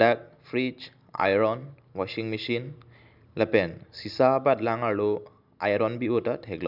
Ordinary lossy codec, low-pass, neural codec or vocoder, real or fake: none; 5.4 kHz; none; real